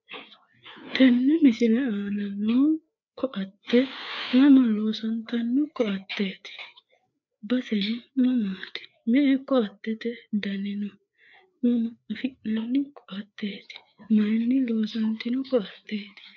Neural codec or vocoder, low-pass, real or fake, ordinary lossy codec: codec, 16 kHz, 4 kbps, FreqCodec, larger model; 7.2 kHz; fake; MP3, 64 kbps